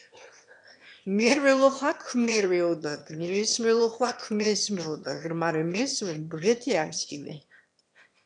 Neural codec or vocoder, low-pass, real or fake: autoencoder, 22.05 kHz, a latent of 192 numbers a frame, VITS, trained on one speaker; 9.9 kHz; fake